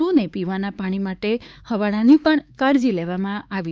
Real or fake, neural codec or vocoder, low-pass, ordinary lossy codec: fake; codec, 16 kHz, 4 kbps, X-Codec, HuBERT features, trained on LibriSpeech; none; none